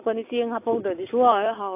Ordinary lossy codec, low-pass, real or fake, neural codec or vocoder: AAC, 32 kbps; 3.6 kHz; real; none